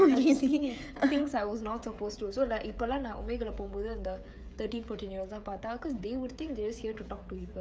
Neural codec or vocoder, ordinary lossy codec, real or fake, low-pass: codec, 16 kHz, 16 kbps, FreqCodec, smaller model; none; fake; none